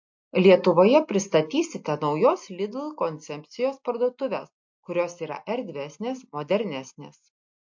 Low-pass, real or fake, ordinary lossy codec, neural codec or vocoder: 7.2 kHz; real; MP3, 48 kbps; none